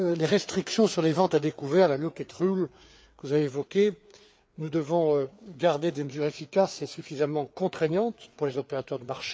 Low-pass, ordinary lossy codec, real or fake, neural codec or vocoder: none; none; fake; codec, 16 kHz, 4 kbps, FreqCodec, larger model